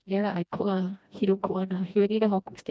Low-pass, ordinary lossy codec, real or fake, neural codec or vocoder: none; none; fake; codec, 16 kHz, 1 kbps, FreqCodec, smaller model